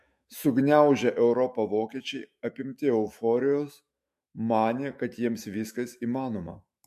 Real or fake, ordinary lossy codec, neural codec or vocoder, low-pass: fake; MP3, 64 kbps; autoencoder, 48 kHz, 128 numbers a frame, DAC-VAE, trained on Japanese speech; 14.4 kHz